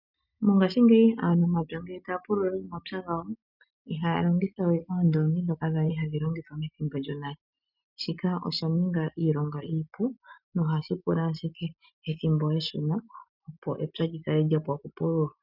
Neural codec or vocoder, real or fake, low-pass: none; real; 5.4 kHz